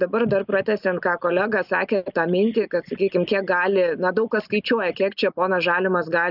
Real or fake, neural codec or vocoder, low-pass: real; none; 5.4 kHz